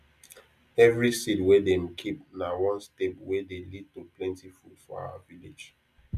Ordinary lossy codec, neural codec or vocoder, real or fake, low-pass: none; none; real; 14.4 kHz